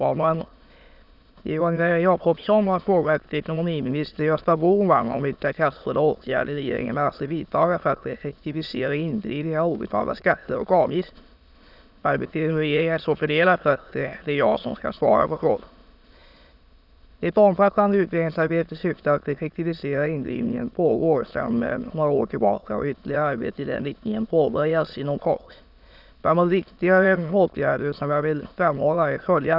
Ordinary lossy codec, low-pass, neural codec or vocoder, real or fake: none; 5.4 kHz; autoencoder, 22.05 kHz, a latent of 192 numbers a frame, VITS, trained on many speakers; fake